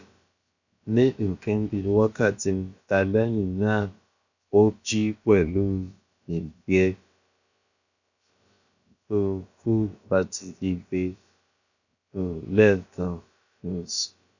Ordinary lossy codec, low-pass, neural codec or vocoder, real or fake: none; 7.2 kHz; codec, 16 kHz, about 1 kbps, DyCAST, with the encoder's durations; fake